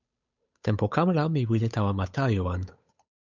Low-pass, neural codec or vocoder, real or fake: 7.2 kHz; codec, 16 kHz, 8 kbps, FunCodec, trained on Chinese and English, 25 frames a second; fake